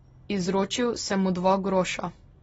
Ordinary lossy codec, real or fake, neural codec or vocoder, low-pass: AAC, 24 kbps; real; none; 10.8 kHz